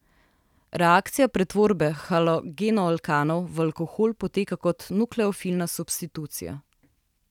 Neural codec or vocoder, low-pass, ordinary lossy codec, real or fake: none; 19.8 kHz; none; real